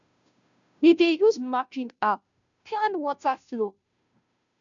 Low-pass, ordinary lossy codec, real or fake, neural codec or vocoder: 7.2 kHz; none; fake; codec, 16 kHz, 0.5 kbps, FunCodec, trained on Chinese and English, 25 frames a second